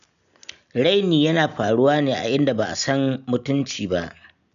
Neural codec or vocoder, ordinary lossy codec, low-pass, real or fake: none; none; 7.2 kHz; real